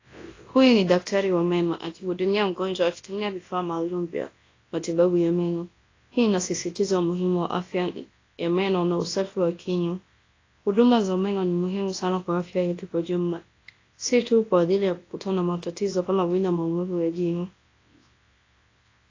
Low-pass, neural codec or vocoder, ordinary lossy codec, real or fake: 7.2 kHz; codec, 24 kHz, 0.9 kbps, WavTokenizer, large speech release; AAC, 32 kbps; fake